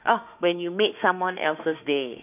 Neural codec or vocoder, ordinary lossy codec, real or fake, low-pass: autoencoder, 48 kHz, 32 numbers a frame, DAC-VAE, trained on Japanese speech; none; fake; 3.6 kHz